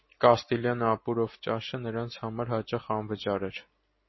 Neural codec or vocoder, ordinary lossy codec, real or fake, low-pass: none; MP3, 24 kbps; real; 7.2 kHz